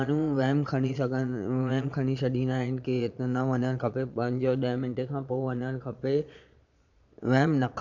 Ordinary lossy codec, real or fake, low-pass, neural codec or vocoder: none; fake; 7.2 kHz; vocoder, 22.05 kHz, 80 mel bands, Vocos